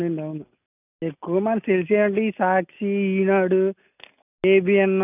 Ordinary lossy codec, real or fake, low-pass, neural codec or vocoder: none; real; 3.6 kHz; none